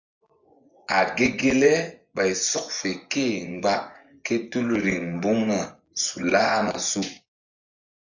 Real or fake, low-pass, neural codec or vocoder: fake; 7.2 kHz; vocoder, 24 kHz, 100 mel bands, Vocos